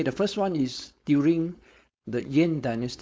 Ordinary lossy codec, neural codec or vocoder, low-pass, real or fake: none; codec, 16 kHz, 4.8 kbps, FACodec; none; fake